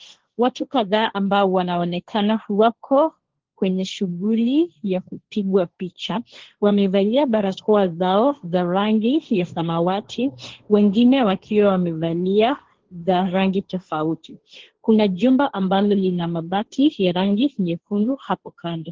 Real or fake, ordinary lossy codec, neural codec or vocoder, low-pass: fake; Opus, 16 kbps; codec, 16 kHz, 1.1 kbps, Voila-Tokenizer; 7.2 kHz